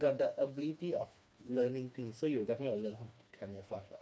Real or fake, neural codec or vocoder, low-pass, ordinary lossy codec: fake; codec, 16 kHz, 2 kbps, FreqCodec, smaller model; none; none